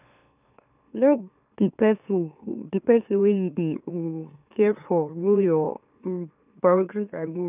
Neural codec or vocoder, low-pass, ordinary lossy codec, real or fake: autoencoder, 44.1 kHz, a latent of 192 numbers a frame, MeloTTS; 3.6 kHz; none; fake